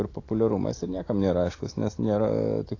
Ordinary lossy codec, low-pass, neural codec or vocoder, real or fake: AAC, 32 kbps; 7.2 kHz; none; real